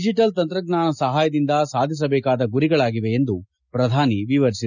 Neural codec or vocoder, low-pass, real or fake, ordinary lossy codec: none; 7.2 kHz; real; none